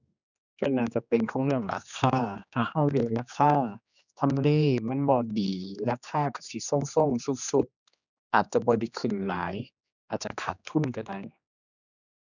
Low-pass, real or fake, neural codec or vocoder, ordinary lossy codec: 7.2 kHz; fake; codec, 16 kHz, 2 kbps, X-Codec, HuBERT features, trained on general audio; none